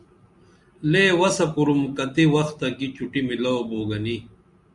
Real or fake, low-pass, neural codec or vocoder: real; 10.8 kHz; none